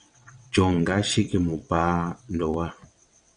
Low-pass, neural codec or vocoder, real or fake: 9.9 kHz; vocoder, 22.05 kHz, 80 mel bands, WaveNeXt; fake